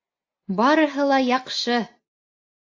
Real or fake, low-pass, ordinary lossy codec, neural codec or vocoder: real; 7.2 kHz; AAC, 48 kbps; none